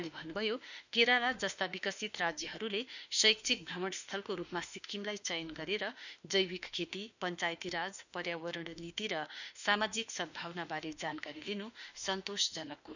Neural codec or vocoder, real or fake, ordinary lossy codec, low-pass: autoencoder, 48 kHz, 32 numbers a frame, DAC-VAE, trained on Japanese speech; fake; none; 7.2 kHz